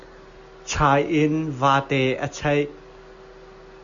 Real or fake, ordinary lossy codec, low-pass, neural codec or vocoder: real; Opus, 64 kbps; 7.2 kHz; none